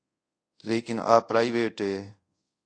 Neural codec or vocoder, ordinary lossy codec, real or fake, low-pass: codec, 24 kHz, 0.5 kbps, DualCodec; AAC, 48 kbps; fake; 9.9 kHz